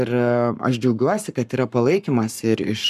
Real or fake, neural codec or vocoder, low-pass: fake; codec, 44.1 kHz, 7.8 kbps, Pupu-Codec; 14.4 kHz